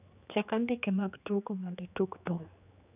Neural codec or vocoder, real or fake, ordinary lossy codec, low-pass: codec, 16 kHz, 2 kbps, X-Codec, HuBERT features, trained on general audio; fake; none; 3.6 kHz